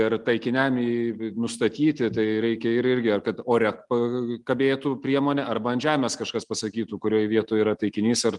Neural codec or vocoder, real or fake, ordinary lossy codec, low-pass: none; real; Opus, 32 kbps; 10.8 kHz